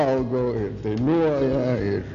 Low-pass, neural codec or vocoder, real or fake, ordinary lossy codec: 7.2 kHz; none; real; MP3, 96 kbps